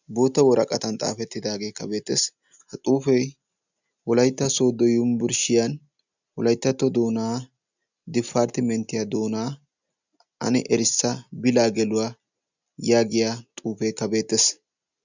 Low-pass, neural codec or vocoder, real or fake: 7.2 kHz; none; real